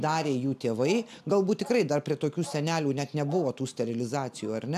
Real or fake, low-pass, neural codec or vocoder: real; 14.4 kHz; none